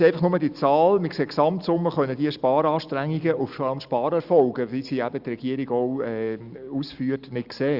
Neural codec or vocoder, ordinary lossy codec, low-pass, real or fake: none; Opus, 64 kbps; 5.4 kHz; real